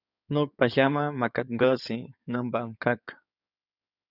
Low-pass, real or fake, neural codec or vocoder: 5.4 kHz; fake; codec, 16 kHz in and 24 kHz out, 2.2 kbps, FireRedTTS-2 codec